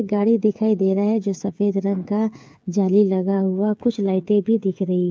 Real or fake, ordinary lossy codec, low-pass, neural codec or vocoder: fake; none; none; codec, 16 kHz, 8 kbps, FreqCodec, smaller model